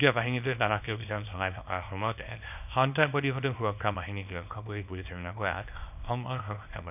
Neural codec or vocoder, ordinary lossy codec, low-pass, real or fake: codec, 24 kHz, 0.9 kbps, WavTokenizer, small release; none; 3.6 kHz; fake